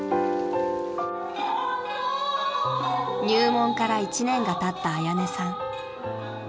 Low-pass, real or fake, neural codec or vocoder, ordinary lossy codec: none; real; none; none